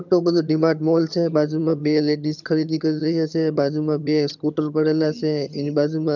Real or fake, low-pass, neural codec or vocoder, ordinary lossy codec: fake; 7.2 kHz; vocoder, 22.05 kHz, 80 mel bands, HiFi-GAN; none